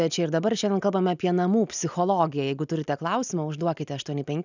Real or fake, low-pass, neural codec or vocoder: real; 7.2 kHz; none